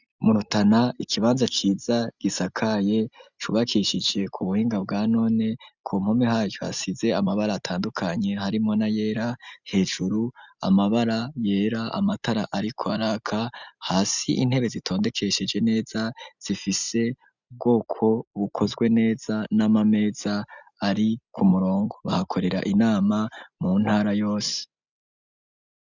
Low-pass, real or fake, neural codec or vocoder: 7.2 kHz; real; none